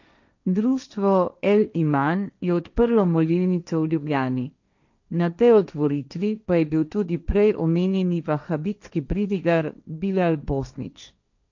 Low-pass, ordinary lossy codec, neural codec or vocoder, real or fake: 7.2 kHz; none; codec, 16 kHz, 1.1 kbps, Voila-Tokenizer; fake